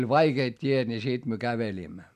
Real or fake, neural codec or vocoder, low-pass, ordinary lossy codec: real; none; 14.4 kHz; none